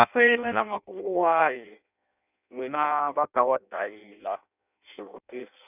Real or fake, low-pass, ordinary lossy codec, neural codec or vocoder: fake; 3.6 kHz; none; codec, 16 kHz in and 24 kHz out, 0.6 kbps, FireRedTTS-2 codec